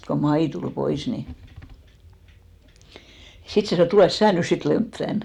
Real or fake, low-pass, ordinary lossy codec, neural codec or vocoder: fake; 19.8 kHz; none; vocoder, 44.1 kHz, 128 mel bands every 256 samples, BigVGAN v2